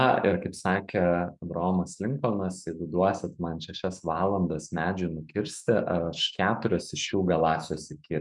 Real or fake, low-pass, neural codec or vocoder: fake; 10.8 kHz; autoencoder, 48 kHz, 128 numbers a frame, DAC-VAE, trained on Japanese speech